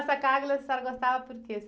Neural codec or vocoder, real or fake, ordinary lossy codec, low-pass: none; real; none; none